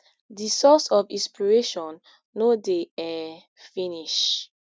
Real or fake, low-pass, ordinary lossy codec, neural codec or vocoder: real; none; none; none